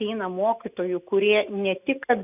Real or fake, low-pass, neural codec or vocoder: real; 3.6 kHz; none